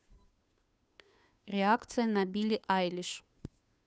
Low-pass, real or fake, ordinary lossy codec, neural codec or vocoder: none; fake; none; codec, 16 kHz, 2 kbps, FunCodec, trained on Chinese and English, 25 frames a second